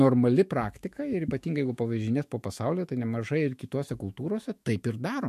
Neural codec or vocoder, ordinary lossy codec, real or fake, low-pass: autoencoder, 48 kHz, 128 numbers a frame, DAC-VAE, trained on Japanese speech; MP3, 64 kbps; fake; 14.4 kHz